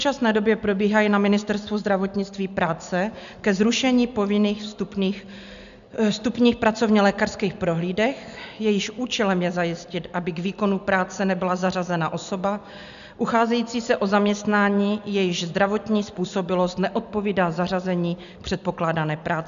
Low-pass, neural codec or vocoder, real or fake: 7.2 kHz; none; real